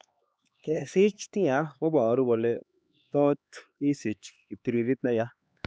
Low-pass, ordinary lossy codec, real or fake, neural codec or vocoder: none; none; fake; codec, 16 kHz, 2 kbps, X-Codec, HuBERT features, trained on LibriSpeech